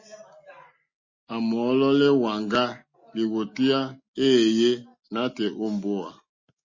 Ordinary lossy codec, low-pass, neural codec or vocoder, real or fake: MP3, 32 kbps; 7.2 kHz; none; real